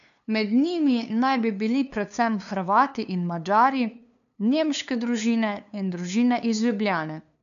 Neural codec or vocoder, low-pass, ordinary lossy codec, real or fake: codec, 16 kHz, 2 kbps, FunCodec, trained on LibriTTS, 25 frames a second; 7.2 kHz; none; fake